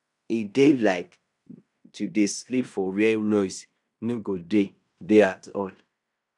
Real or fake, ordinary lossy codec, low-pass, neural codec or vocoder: fake; none; 10.8 kHz; codec, 16 kHz in and 24 kHz out, 0.9 kbps, LongCat-Audio-Codec, fine tuned four codebook decoder